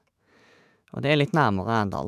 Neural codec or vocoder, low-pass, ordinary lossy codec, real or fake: autoencoder, 48 kHz, 128 numbers a frame, DAC-VAE, trained on Japanese speech; 14.4 kHz; none; fake